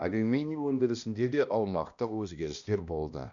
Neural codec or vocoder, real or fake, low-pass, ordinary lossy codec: codec, 16 kHz, 1 kbps, X-Codec, HuBERT features, trained on balanced general audio; fake; 7.2 kHz; none